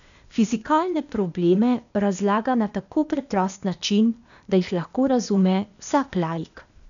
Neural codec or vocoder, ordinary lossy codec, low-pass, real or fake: codec, 16 kHz, 0.8 kbps, ZipCodec; none; 7.2 kHz; fake